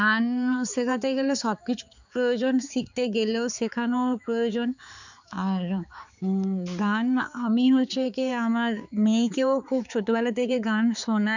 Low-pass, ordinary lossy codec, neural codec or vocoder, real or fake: 7.2 kHz; none; codec, 16 kHz, 4 kbps, X-Codec, HuBERT features, trained on balanced general audio; fake